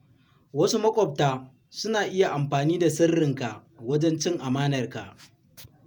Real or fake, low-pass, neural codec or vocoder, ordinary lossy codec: fake; none; vocoder, 48 kHz, 128 mel bands, Vocos; none